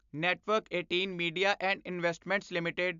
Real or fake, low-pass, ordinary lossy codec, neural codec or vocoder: real; 7.2 kHz; AAC, 96 kbps; none